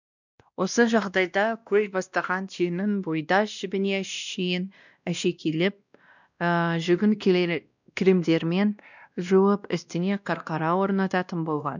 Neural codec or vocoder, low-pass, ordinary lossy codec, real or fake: codec, 16 kHz, 1 kbps, X-Codec, WavLM features, trained on Multilingual LibriSpeech; 7.2 kHz; none; fake